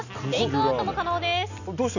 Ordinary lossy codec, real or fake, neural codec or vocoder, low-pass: none; real; none; 7.2 kHz